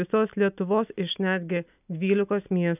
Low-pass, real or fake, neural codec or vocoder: 3.6 kHz; real; none